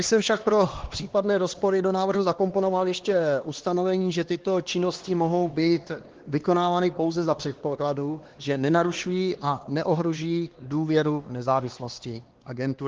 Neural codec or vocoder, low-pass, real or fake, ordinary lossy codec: codec, 16 kHz, 2 kbps, X-Codec, HuBERT features, trained on LibriSpeech; 7.2 kHz; fake; Opus, 16 kbps